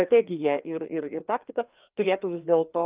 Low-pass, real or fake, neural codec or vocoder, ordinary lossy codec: 3.6 kHz; fake; codec, 16 kHz, 2 kbps, FreqCodec, larger model; Opus, 32 kbps